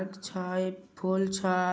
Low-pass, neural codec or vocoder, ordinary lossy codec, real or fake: none; none; none; real